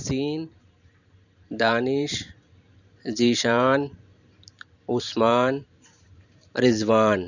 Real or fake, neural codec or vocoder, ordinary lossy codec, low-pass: fake; vocoder, 44.1 kHz, 128 mel bands every 512 samples, BigVGAN v2; none; 7.2 kHz